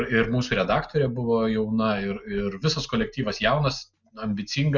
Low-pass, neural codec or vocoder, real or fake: 7.2 kHz; none; real